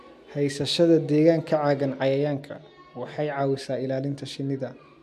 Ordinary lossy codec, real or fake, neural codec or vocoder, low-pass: MP3, 96 kbps; real; none; 14.4 kHz